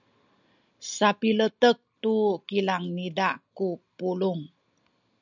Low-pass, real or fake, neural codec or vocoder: 7.2 kHz; real; none